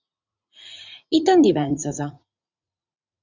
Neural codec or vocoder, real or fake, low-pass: vocoder, 44.1 kHz, 80 mel bands, Vocos; fake; 7.2 kHz